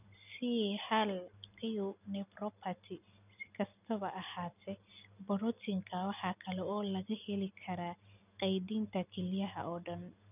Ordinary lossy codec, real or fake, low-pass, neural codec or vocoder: none; real; 3.6 kHz; none